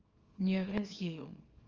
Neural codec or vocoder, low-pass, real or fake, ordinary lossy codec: codec, 24 kHz, 0.9 kbps, WavTokenizer, small release; 7.2 kHz; fake; Opus, 24 kbps